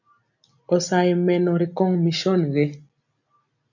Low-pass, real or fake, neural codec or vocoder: 7.2 kHz; real; none